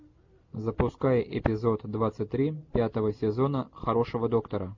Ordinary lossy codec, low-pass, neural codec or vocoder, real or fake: MP3, 48 kbps; 7.2 kHz; none; real